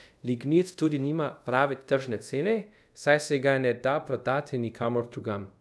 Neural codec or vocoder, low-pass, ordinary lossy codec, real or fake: codec, 24 kHz, 0.5 kbps, DualCodec; none; none; fake